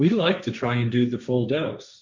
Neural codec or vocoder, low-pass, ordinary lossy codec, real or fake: codec, 16 kHz, 1.1 kbps, Voila-Tokenizer; 7.2 kHz; MP3, 64 kbps; fake